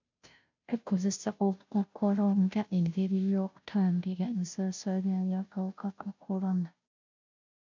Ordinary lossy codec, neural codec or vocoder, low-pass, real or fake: MP3, 64 kbps; codec, 16 kHz, 0.5 kbps, FunCodec, trained on Chinese and English, 25 frames a second; 7.2 kHz; fake